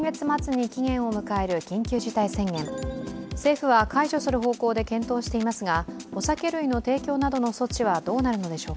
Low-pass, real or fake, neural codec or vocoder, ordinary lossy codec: none; real; none; none